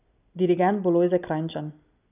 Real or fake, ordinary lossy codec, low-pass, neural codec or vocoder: real; none; 3.6 kHz; none